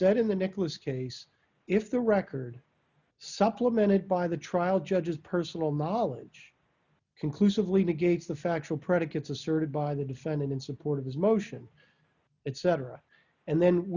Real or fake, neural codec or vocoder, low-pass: real; none; 7.2 kHz